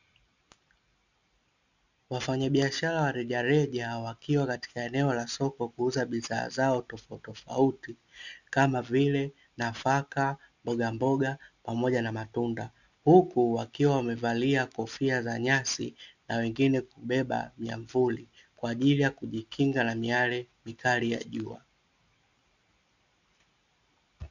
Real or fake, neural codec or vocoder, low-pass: real; none; 7.2 kHz